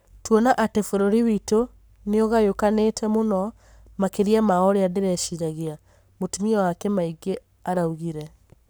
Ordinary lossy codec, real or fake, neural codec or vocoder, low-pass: none; fake; codec, 44.1 kHz, 7.8 kbps, Pupu-Codec; none